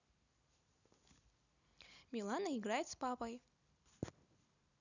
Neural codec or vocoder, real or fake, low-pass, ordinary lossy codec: none; real; 7.2 kHz; none